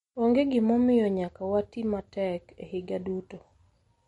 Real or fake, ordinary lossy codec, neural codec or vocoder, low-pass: real; MP3, 48 kbps; none; 19.8 kHz